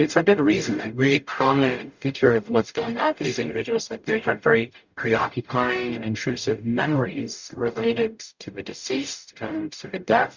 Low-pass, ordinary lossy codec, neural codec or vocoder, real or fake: 7.2 kHz; Opus, 64 kbps; codec, 44.1 kHz, 0.9 kbps, DAC; fake